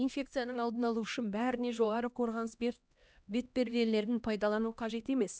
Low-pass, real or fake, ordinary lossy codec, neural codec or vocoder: none; fake; none; codec, 16 kHz, 1 kbps, X-Codec, HuBERT features, trained on LibriSpeech